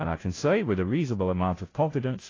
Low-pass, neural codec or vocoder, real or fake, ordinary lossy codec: 7.2 kHz; codec, 16 kHz, 0.5 kbps, FunCodec, trained on Chinese and English, 25 frames a second; fake; AAC, 32 kbps